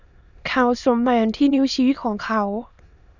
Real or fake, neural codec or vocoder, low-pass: fake; autoencoder, 22.05 kHz, a latent of 192 numbers a frame, VITS, trained on many speakers; 7.2 kHz